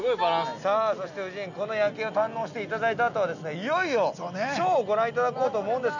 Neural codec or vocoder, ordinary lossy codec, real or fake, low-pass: none; none; real; 7.2 kHz